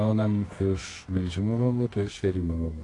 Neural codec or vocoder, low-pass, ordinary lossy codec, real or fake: codec, 24 kHz, 0.9 kbps, WavTokenizer, medium music audio release; 10.8 kHz; AAC, 48 kbps; fake